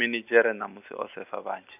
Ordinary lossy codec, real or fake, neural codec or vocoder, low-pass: none; real; none; 3.6 kHz